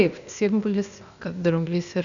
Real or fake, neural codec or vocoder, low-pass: fake; codec, 16 kHz, 0.8 kbps, ZipCodec; 7.2 kHz